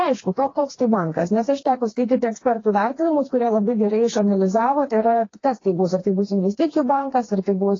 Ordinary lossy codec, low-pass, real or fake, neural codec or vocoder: AAC, 32 kbps; 7.2 kHz; fake; codec, 16 kHz, 2 kbps, FreqCodec, smaller model